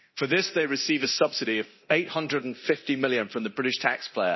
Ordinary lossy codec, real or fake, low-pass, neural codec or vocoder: MP3, 24 kbps; fake; 7.2 kHz; codec, 24 kHz, 0.9 kbps, DualCodec